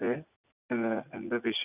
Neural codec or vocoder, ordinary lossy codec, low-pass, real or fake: vocoder, 44.1 kHz, 80 mel bands, Vocos; none; 3.6 kHz; fake